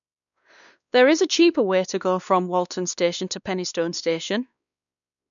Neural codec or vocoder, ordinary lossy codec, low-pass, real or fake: codec, 16 kHz, 2 kbps, X-Codec, WavLM features, trained on Multilingual LibriSpeech; none; 7.2 kHz; fake